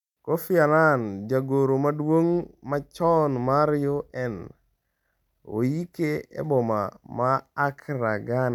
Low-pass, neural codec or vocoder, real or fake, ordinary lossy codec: 19.8 kHz; none; real; none